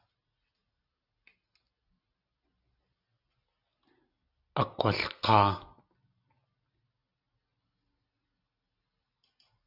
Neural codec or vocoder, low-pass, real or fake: none; 5.4 kHz; real